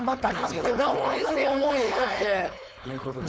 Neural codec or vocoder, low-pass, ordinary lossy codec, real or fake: codec, 16 kHz, 4.8 kbps, FACodec; none; none; fake